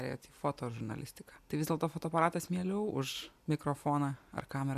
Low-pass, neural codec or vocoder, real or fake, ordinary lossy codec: 14.4 kHz; none; real; AAC, 96 kbps